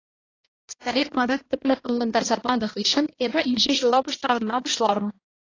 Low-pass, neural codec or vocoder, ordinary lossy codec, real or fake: 7.2 kHz; codec, 16 kHz, 1 kbps, X-Codec, HuBERT features, trained on balanced general audio; AAC, 32 kbps; fake